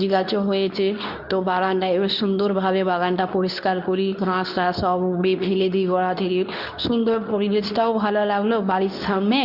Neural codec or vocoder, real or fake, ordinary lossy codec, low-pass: codec, 24 kHz, 0.9 kbps, WavTokenizer, medium speech release version 2; fake; none; 5.4 kHz